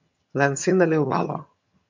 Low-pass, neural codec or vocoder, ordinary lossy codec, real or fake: 7.2 kHz; vocoder, 22.05 kHz, 80 mel bands, HiFi-GAN; MP3, 64 kbps; fake